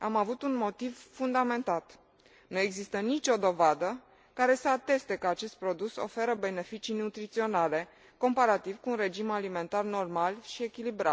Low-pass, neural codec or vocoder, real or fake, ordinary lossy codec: none; none; real; none